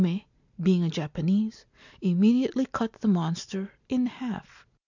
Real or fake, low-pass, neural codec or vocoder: real; 7.2 kHz; none